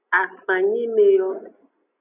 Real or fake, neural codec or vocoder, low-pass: real; none; 3.6 kHz